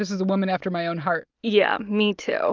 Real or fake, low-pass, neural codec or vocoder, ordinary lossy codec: real; 7.2 kHz; none; Opus, 24 kbps